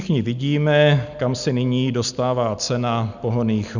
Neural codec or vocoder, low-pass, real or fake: none; 7.2 kHz; real